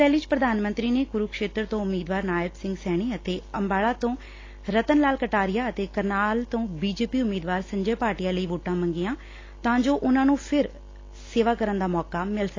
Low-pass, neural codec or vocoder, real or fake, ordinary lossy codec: 7.2 kHz; none; real; AAC, 32 kbps